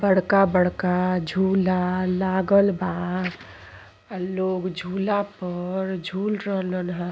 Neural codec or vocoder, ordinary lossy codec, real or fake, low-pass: none; none; real; none